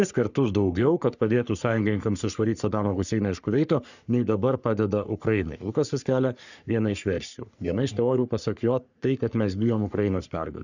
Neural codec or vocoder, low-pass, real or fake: codec, 44.1 kHz, 3.4 kbps, Pupu-Codec; 7.2 kHz; fake